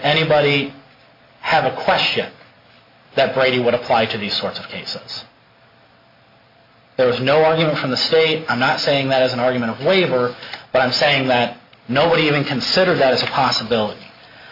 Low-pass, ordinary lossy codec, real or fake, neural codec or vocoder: 5.4 kHz; MP3, 32 kbps; real; none